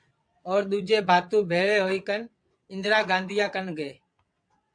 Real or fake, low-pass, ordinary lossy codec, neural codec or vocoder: fake; 9.9 kHz; MP3, 64 kbps; vocoder, 22.05 kHz, 80 mel bands, WaveNeXt